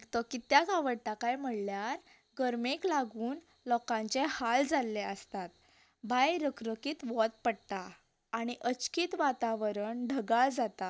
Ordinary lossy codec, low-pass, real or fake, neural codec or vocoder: none; none; real; none